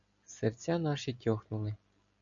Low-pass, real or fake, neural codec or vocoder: 7.2 kHz; real; none